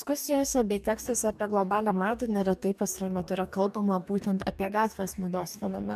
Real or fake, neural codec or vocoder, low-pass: fake; codec, 44.1 kHz, 2.6 kbps, DAC; 14.4 kHz